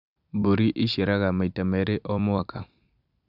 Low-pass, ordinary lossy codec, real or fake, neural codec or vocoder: 5.4 kHz; none; fake; vocoder, 44.1 kHz, 128 mel bands every 256 samples, BigVGAN v2